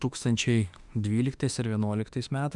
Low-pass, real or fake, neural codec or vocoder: 10.8 kHz; fake; autoencoder, 48 kHz, 32 numbers a frame, DAC-VAE, trained on Japanese speech